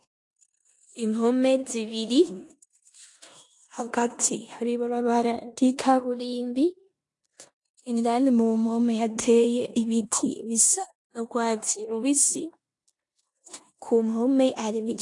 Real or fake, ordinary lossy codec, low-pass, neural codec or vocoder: fake; AAC, 48 kbps; 10.8 kHz; codec, 16 kHz in and 24 kHz out, 0.9 kbps, LongCat-Audio-Codec, four codebook decoder